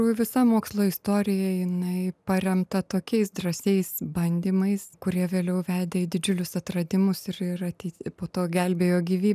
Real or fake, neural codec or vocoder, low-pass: real; none; 14.4 kHz